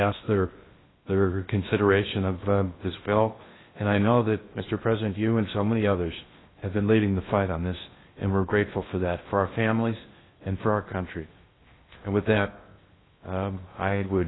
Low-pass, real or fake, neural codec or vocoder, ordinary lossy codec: 7.2 kHz; fake; codec, 16 kHz in and 24 kHz out, 0.6 kbps, FocalCodec, streaming, 2048 codes; AAC, 16 kbps